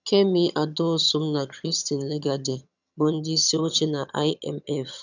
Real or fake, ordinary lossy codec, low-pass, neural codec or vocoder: fake; none; 7.2 kHz; vocoder, 22.05 kHz, 80 mel bands, HiFi-GAN